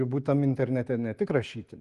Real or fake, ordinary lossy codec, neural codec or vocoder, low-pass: real; Opus, 24 kbps; none; 10.8 kHz